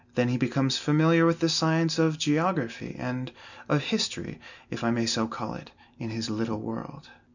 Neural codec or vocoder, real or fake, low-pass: none; real; 7.2 kHz